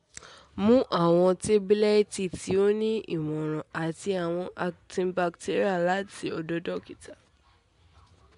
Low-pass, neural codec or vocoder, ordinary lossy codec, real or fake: 10.8 kHz; none; MP3, 64 kbps; real